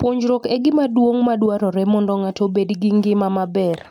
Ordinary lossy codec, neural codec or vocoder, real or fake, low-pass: none; none; real; 19.8 kHz